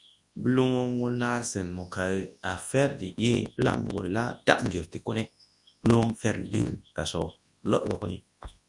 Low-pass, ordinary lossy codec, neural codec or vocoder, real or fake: 10.8 kHz; Opus, 64 kbps; codec, 24 kHz, 0.9 kbps, WavTokenizer, large speech release; fake